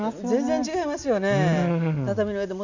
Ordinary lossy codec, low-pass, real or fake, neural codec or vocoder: none; 7.2 kHz; real; none